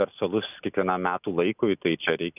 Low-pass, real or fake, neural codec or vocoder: 3.6 kHz; real; none